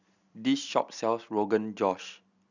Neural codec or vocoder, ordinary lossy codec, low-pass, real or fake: none; none; 7.2 kHz; real